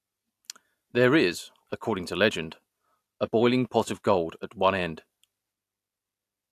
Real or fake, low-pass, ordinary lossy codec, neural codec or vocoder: real; 14.4 kHz; AAC, 64 kbps; none